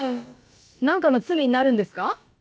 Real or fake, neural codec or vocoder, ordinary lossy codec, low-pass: fake; codec, 16 kHz, about 1 kbps, DyCAST, with the encoder's durations; none; none